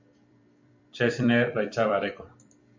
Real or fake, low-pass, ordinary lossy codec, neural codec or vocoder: fake; 7.2 kHz; MP3, 64 kbps; vocoder, 44.1 kHz, 128 mel bands every 256 samples, BigVGAN v2